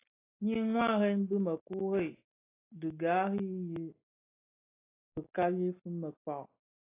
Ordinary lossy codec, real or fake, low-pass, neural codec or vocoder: AAC, 24 kbps; real; 3.6 kHz; none